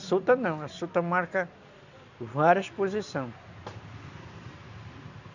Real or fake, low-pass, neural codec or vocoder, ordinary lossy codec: real; 7.2 kHz; none; none